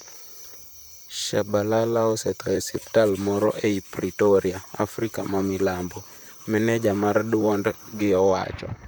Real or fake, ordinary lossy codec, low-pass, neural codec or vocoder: fake; none; none; vocoder, 44.1 kHz, 128 mel bands, Pupu-Vocoder